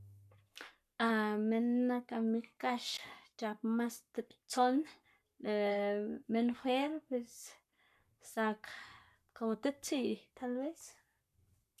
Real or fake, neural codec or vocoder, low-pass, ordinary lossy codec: fake; codec, 44.1 kHz, 7.8 kbps, Pupu-Codec; 14.4 kHz; none